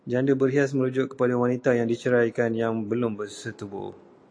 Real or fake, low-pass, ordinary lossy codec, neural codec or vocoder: real; 9.9 kHz; AAC, 48 kbps; none